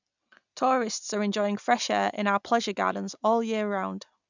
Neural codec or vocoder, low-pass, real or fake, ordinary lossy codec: none; 7.2 kHz; real; none